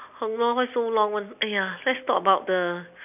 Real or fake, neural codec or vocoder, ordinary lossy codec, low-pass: real; none; none; 3.6 kHz